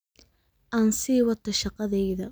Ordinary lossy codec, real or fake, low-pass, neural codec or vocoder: none; real; none; none